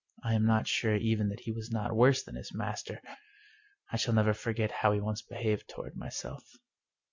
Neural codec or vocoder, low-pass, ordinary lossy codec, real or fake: none; 7.2 kHz; MP3, 64 kbps; real